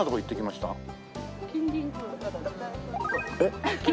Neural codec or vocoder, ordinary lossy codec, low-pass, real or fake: none; none; none; real